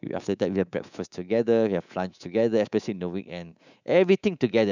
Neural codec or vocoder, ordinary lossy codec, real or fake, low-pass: codec, 24 kHz, 3.1 kbps, DualCodec; none; fake; 7.2 kHz